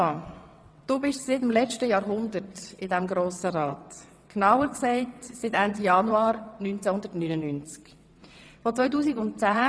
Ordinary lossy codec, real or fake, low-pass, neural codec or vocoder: none; fake; none; vocoder, 22.05 kHz, 80 mel bands, WaveNeXt